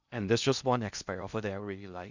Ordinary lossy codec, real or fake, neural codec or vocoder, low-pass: Opus, 64 kbps; fake; codec, 16 kHz in and 24 kHz out, 0.6 kbps, FocalCodec, streaming, 2048 codes; 7.2 kHz